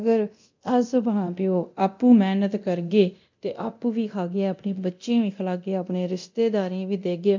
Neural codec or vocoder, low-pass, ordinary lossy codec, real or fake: codec, 24 kHz, 0.9 kbps, DualCodec; 7.2 kHz; MP3, 64 kbps; fake